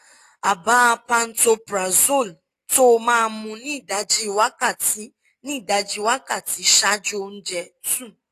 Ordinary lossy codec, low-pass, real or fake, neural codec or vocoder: AAC, 48 kbps; 14.4 kHz; real; none